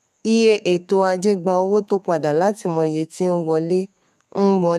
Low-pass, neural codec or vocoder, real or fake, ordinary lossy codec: 14.4 kHz; codec, 32 kHz, 1.9 kbps, SNAC; fake; none